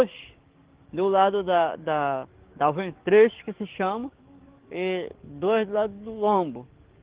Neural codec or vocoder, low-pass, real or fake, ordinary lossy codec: codec, 44.1 kHz, 7.8 kbps, DAC; 3.6 kHz; fake; Opus, 16 kbps